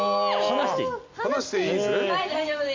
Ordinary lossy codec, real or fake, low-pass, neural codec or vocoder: MP3, 48 kbps; real; 7.2 kHz; none